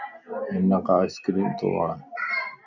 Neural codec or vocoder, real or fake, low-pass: none; real; 7.2 kHz